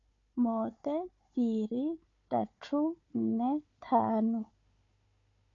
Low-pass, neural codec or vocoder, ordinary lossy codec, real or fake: 7.2 kHz; codec, 16 kHz, 4 kbps, FunCodec, trained on Chinese and English, 50 frames a second; AAC, 48 kbps; fake